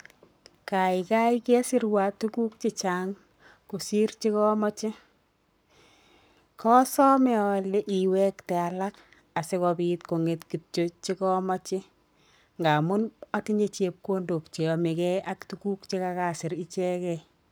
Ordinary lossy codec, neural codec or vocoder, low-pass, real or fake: none; codec, 44.1 kHz, 7.8 kbps, Pupu-Codec; none; fake